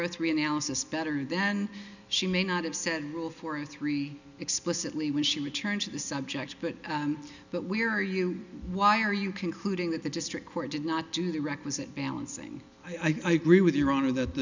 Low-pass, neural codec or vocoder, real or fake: 7.2 kHz; none; real